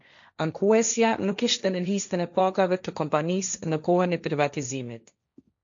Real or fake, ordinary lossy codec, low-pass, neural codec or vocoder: fake; MP3, 64 kbps; 7.2 kHz; codec, 16 kHz, 1.1 kbps, Voila-Tokenizer